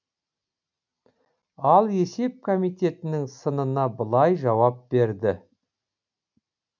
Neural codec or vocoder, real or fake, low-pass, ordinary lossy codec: none; real; 7.2 kHz; none